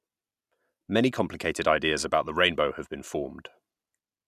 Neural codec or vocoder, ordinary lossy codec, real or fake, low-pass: none; none; real; 14.4 kHz